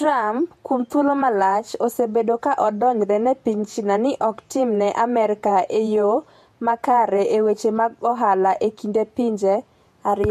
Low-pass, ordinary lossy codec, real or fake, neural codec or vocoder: 14.4 kHz; MP3, 64 kbps; fake; vocoder, 48 kHz, 128 mel bands, Vocos